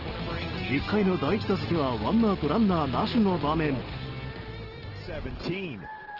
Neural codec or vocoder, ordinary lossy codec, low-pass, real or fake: none; Opus, 16 kbps; 5.4 kHz; real